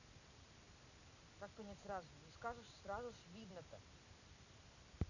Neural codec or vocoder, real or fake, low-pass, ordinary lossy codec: none; real; 7.2 kHz; none